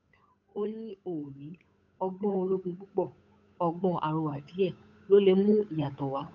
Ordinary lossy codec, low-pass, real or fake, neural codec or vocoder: none; 7.2 kHz; fake; codec, 16 kHz, 8 kbps, FunCodec, trained on Chinese and English, 25 frames a second